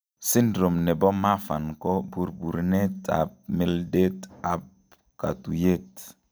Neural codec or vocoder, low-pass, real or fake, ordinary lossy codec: none; none; real; none